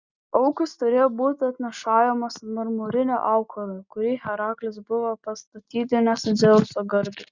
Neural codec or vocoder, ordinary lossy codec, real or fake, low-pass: none; Opus, 64 kbps; real; 7.2 kHz